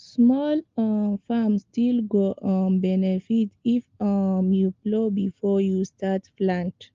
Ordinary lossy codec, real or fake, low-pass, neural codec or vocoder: Opus, 32 kbps; real; 7.2 kHz; none